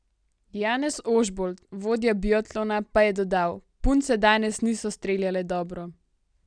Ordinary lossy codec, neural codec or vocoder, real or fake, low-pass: none; none; real; 9.9 kHz